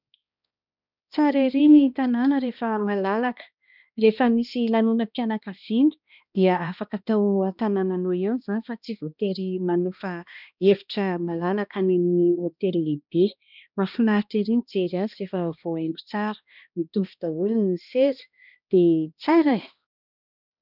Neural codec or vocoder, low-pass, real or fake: codec, 16 kHz, 1 kbps, X-Codec, HuBERT features, trained on balanced general audio; 5.4 kHz; fake